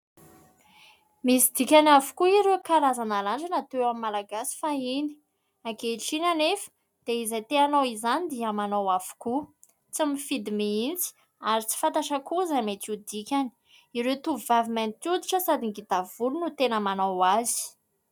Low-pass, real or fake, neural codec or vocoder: 19.8 kHz; real; none